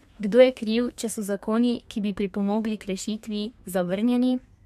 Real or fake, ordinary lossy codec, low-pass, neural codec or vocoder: fake; none; 14.4 kHz; codec, 32 kHz, 1.9 kbps, SNAC